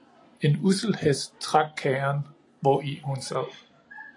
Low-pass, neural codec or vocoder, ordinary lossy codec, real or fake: 10.8 kHz; none; AAC, 48 kbps; real